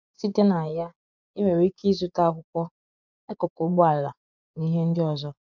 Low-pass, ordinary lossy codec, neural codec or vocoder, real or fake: 7.2 kHz; none; vocoder, 24 kHz, 100 mel bands, Vocos; fake